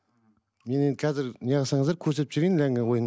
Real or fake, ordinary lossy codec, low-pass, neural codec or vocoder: real; none; none; none